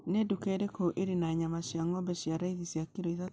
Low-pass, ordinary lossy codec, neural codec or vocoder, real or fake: none; none; none; real